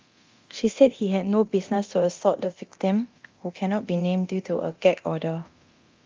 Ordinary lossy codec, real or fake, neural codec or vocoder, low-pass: Opus, 32 kbps; fake; codec, 24 kHz, 0.9 kbps, DualCodec; 7.2 kHz